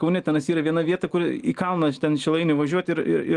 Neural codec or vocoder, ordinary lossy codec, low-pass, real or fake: none; Opus, 24 kbps; 10.8 kHz; real